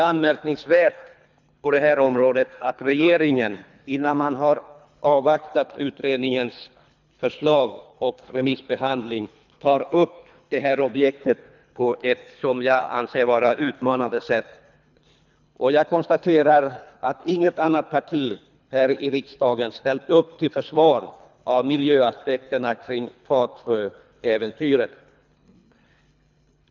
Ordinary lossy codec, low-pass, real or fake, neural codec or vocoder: none; 7.2 kHz; fake; codec, 24 kHz, 3 kbps, HILCodec